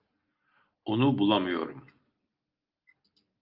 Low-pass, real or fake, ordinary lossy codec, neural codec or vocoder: 5.4 kHz; real; Opus, 24 kbps; none